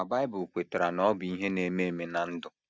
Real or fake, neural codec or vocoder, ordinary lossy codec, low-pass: real; none; none; none